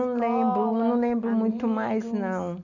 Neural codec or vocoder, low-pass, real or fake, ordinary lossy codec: none; 7.2 kHz; real; none